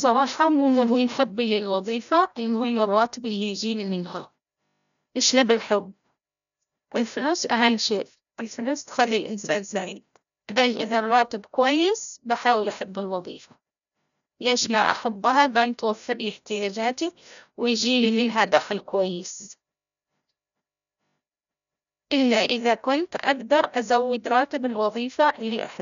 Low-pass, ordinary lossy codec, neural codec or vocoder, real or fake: 7.2 kHz; none; codec, 16 kHz, 0.5 kbps, FreqCodec, larger model; fake